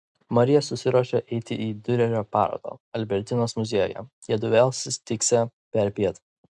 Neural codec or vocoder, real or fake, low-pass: none; real; 10.8 kHz